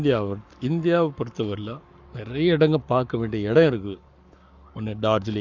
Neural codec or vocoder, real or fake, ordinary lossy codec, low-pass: codec, 44.1 kHz, 7.8 kbps, Pupu-Codec; fake; none; 7.2 kHz